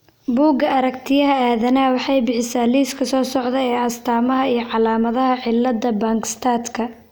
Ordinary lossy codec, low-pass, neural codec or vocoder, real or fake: none; none; none; real